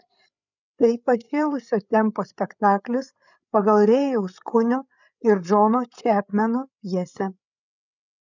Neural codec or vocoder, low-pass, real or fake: codec, 16 kHz, 8 kbps, FreqCodec, larger model; 7.2 kHz; fake